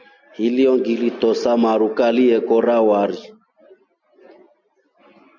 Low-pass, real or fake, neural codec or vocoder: 7.2 kHz; real; none